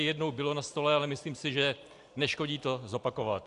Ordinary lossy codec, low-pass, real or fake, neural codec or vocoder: AAC, 64 kbps; 10.8 kHz; real; none